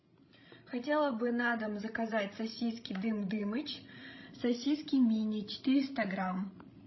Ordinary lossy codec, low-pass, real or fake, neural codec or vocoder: MP3, 24 kbps; 7.2 kHz; fake; codec, 16 kHz, 16 kbps, FreqCodec, larger model